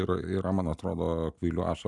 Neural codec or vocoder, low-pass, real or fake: none; 10.8 kHz; real